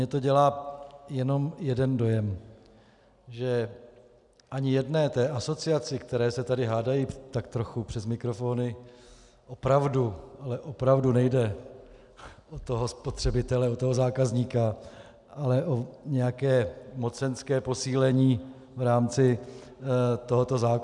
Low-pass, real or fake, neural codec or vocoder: 10.8 kHz; real; none